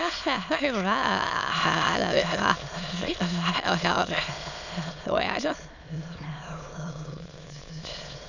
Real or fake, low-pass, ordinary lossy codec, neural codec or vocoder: fake; 7.2 kHz; none; autoencoder, 22.05 kHz, a latent of 192 numbers a frame, VITS, trained on many speakers